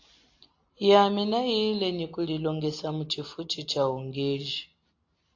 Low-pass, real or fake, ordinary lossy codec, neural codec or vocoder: 7.2 kHz; real; AAC, 48 kbps; none